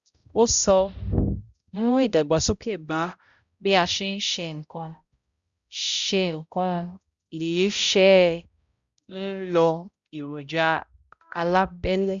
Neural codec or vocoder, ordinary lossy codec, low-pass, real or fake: codec, 16 kHz, 0.5 kbps, X-Codec, HuBERT features, trained on balanced general audio; Opus, 64 kbps; 7.2 kHz; fake